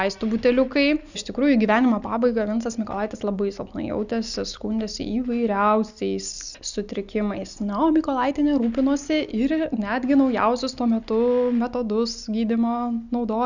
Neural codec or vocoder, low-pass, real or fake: none; 7.2 kHz; real